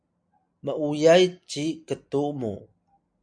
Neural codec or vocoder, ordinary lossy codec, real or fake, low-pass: none; MP3, 64 kbps; real; 9.9 kHz